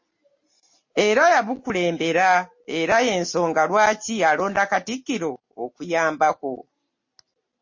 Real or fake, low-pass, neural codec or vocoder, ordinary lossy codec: real; 7.2 kHz; none; MP3, 32 kbps